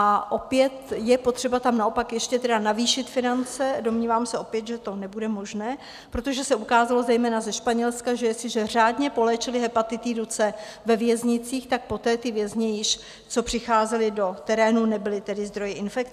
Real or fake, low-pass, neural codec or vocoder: real; 14.4 kHz; none